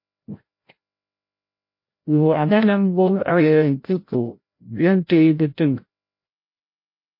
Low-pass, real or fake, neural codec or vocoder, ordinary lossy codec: 5.4 kHz; fake; codec, 16 kHz, 0.5 kbps, FreqCodec, larger model; MP3, 32 kbps